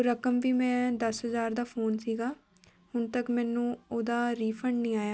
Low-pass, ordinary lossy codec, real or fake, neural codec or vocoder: none; none; real; none